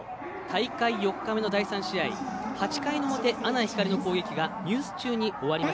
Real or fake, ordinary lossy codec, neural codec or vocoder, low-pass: real; none; none; none